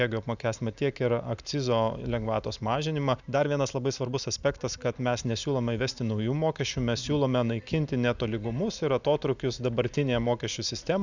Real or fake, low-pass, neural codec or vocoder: real; 7.2 kHz; none